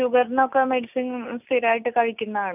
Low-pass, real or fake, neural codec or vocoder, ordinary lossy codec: 3.6 kHz; real; none; none